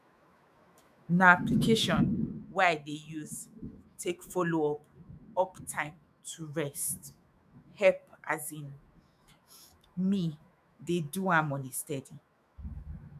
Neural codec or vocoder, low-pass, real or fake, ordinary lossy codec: autoencoder, 48 kHz, 128 numbers a frame, DAC-VAE, trained on Japanese speech; 14.4 kHz; fake; none